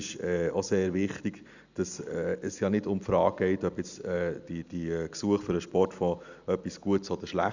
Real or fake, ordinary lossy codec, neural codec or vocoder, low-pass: real; none; none; 7.2 kHz